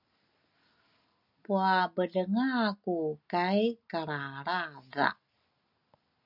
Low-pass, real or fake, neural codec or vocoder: 5.4 kHz; real; none